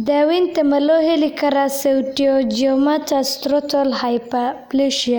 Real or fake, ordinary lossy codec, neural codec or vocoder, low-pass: real; none; none; none